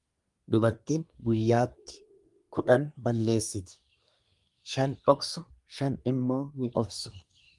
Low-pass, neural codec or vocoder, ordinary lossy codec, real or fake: 10.8 kHz; codec, 24 kHz, 1 kbps, SNAC; Opus, 32 kbps; fake